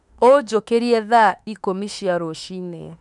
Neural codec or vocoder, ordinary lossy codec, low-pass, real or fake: autoencoder, 48 kHz, 32 numbers a frame, DAC-VAE, trained on Japanese speech; none; 10.8 kHz; fake